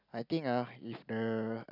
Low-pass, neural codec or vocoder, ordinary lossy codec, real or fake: 5.4 kHz; none; none; real